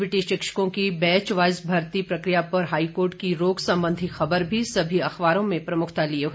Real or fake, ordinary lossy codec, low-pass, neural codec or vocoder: real; none; none; none